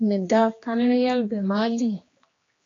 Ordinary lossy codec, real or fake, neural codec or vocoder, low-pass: AAC, 32 kbps; fake; codec, 16 kHz, 2 kbps, X-Codec, HuBERT features, trained on general audio; 7.2 kHz